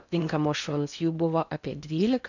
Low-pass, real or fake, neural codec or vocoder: 7.2 kHz; fake; codec, 16 kHz in and 24 kHz out, 0.6 kbps, FocalCodec, streaming, 4096 codes